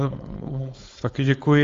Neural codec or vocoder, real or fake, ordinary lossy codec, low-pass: codec, 16 kHz, 4.8 kbps, FACodec; fake; Opus, 32 kbps; 7.2 kHz